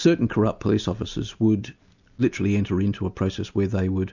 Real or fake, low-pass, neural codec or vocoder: real; 7.2 kHz; none